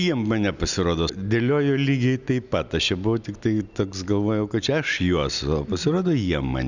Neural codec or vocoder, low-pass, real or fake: none; 7.2 kHz; real